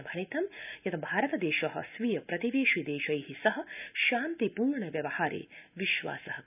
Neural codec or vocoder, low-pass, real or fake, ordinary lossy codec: none; 3.6 kHz; real; none